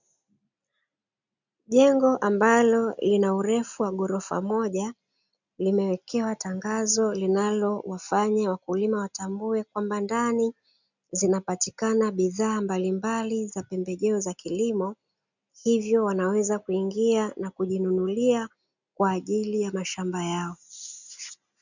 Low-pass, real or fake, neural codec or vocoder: 7.2 kHz; real; none